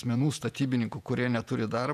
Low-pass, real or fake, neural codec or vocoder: 14.4 kHz; fake; vocoder, 48 kHz, 128 mel bands, Vocos